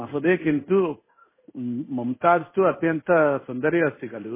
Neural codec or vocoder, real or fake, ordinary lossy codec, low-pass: codec, 16 kHz in and 24 kHz out, 1 kbps, XY-Tokenizer; fake; MP3, 16 kbps; 3.6 kHz